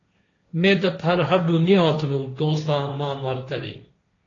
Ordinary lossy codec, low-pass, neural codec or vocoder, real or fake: AAC, 32 kbps; 7.2 kHz; codec, 16 kHz, 1.1 kbps, Voila-Tokenizer; fake